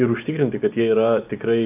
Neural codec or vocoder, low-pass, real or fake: none; 3.6 kHz; real